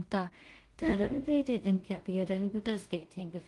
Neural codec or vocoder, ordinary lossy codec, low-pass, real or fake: codec, 16 kHz in and 24 kHz out, 0.4 kbps, LongCat-Audio-Codec, two codebook decoder; Opus, 24 kbps; 10.8 kHz; fake